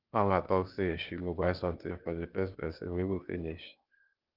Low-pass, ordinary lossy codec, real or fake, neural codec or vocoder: 5.4 kHz; Opus, 24 kbps; fake; codec, 16 kHz, 0.8 kbps, ZipCodec